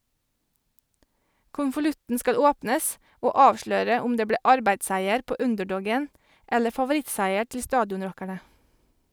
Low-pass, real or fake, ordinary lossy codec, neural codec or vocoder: none; real; none; none